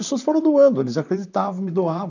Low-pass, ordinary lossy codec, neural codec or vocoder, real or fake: 7.2 kHz; none; vocoder, 44.1 kHz, 128 mel bands, Pupu-Vocoder; fake